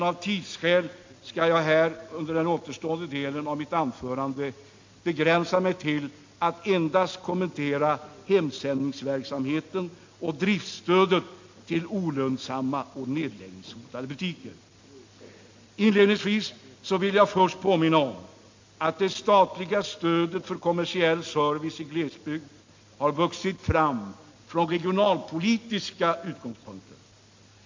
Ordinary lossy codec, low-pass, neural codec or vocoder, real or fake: MP3, 48 kbps; 7.2 kHz; none; real